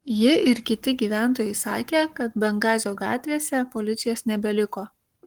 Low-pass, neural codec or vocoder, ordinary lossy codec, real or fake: 19.8 kHz; codec, 44.1 kHz, 7.8 kbps, Pupu-Codec; Opus, 24 kbps; fake